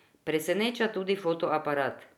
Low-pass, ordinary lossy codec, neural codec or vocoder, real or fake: 19.8 kHz; none; none; real